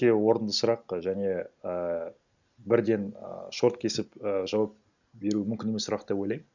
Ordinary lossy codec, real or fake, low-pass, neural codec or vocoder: none; real; 7.2 kHz; none